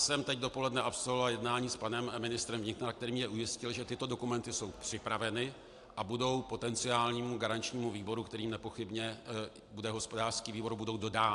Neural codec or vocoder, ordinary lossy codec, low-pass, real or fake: none; AAC, 64 kbps; 10.8 kHz; real